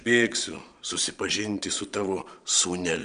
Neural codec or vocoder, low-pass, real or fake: vocoder, 22.05 kHz, 80 mel bands, Vocos; 9.9 kHz; fake